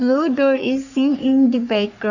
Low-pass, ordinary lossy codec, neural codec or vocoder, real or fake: 7.2 kHz; none; codec, 44.1 kHz, 3.4 kbps, Pupu-Codec; fake